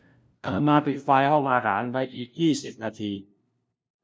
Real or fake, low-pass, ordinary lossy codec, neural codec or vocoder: fake; none; none; codec, 16 kHz, 0.5 kbps, FunCodec, trained on LibriTTS, 25 frames a second